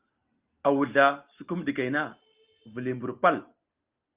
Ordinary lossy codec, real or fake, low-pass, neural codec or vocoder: Opus, 24 kbps; real; 3.6 kHz; none